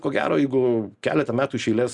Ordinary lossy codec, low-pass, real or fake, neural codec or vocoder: Opus, 64 kbps; 10.8 kHz; real; none